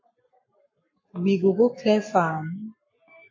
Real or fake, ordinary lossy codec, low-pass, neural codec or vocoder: fake; MP3, 32 kbps; 7.2 kHz; codec, 44.1 kHz, 7.8 kbps, Pupu-Codec